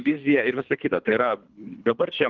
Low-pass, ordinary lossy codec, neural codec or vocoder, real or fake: 7.2 kHz; Opus, 32 kbps; codec, 24 kHz, 3 kbps, HILCodec; fake